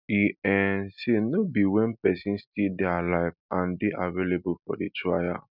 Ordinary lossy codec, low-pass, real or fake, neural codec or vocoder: none; 5.4 kHz; real; none